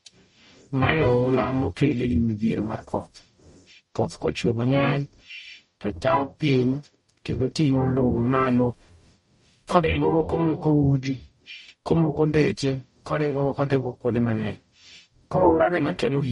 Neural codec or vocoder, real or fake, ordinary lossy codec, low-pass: codec, 44.1 kHz, 0.9 kbps, DAC; fake; MP3, 48 kbps; 19.8 kHz